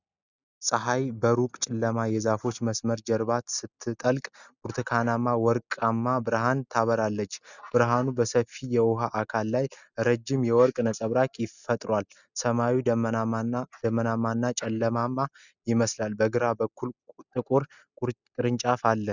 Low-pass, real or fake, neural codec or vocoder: 7.2 kHz; real; none